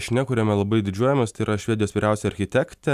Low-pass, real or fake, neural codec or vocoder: 14.4 kHz; real; none